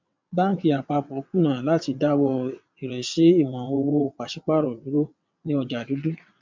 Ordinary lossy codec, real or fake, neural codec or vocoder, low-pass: MP3, 64 kbps; fake; vocoder, 22.05 kHz, 80 mel bands, WaveNeXt; 7.2 kHz